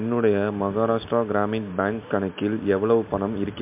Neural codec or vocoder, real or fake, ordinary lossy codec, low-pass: none; real; MP3, 32 kbps; 3.6 kHz